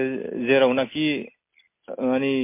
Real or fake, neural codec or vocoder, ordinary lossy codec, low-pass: real; none; MP3, 24 kbps; 3.6 kHz